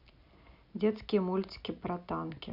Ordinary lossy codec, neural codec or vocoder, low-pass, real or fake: none; none; 5.4 kHz; real